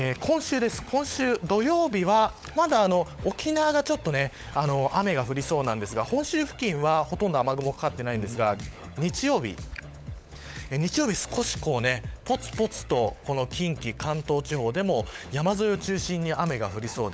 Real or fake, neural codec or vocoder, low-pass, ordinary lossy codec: fake; codec, 16 kHz, 16 kbps, FunCodec, trained on LibriTTS, 50 frames a second; none; none